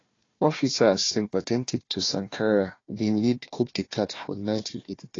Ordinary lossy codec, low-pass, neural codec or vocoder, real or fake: AAC, 32 kbps; 7.2 kHz; codec, 16 kHz, 1 kbps, FunCodec, trained on Chinese and English, 50 frames a second; fake